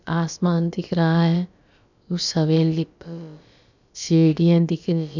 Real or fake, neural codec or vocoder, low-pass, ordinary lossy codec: fake; codec, 16 kHz, about 1 kbps, DyCAST, with the encoder's durations; 7.2 kHz; none